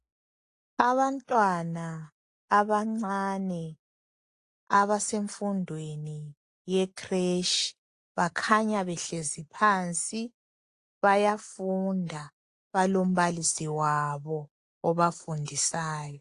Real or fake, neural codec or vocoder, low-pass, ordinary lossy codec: real; none; 10.8 kHz; AAC, 48 kbps